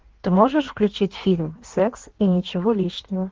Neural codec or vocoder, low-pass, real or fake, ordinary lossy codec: codec, 16 kHz in and 24 kHz out, 1.1 kbps, FireRedTTS-2 codec; 7.2 kHz; fake; Opus, 16 kbps